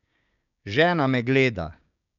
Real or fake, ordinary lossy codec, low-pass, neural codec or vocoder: fake; none; 7.2 kHz; codec, 16 kHz, 6 kbps, DAC